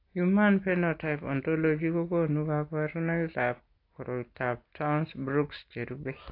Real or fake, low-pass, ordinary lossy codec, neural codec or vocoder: real; 5.4 kHz; AAC, 32 kbps; none